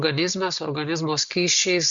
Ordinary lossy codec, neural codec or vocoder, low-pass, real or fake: Opus, 64 kbps; none; 7.2 kHz; real